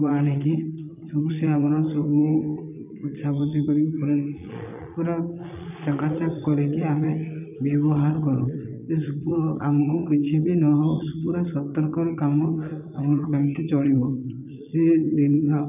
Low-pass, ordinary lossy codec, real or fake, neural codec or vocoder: 3.6 kHz; none; fake; vocoder, 22.05 kHz, 80 mel bands, Vocos